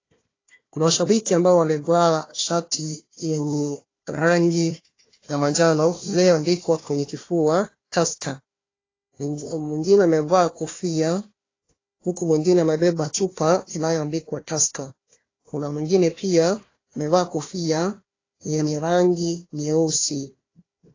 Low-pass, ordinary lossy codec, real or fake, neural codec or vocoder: 7.2 kHz; AAC, 32 kbps; fake; codec, 16 kHz, 1 kbps, FunCodec, trained on Chinese and English, 50 frames a second